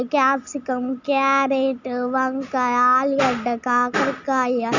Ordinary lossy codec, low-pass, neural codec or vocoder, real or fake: none; 7.2 kHz; none; real